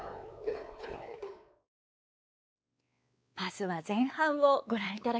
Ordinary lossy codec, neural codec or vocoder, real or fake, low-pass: none; codec, 16 kHz, 4 kbps, X-Codec, WavLM features, trained on Multilingual LibriSpeech; fake; none